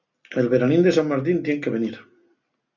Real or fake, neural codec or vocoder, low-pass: real; none; 7.2 kHz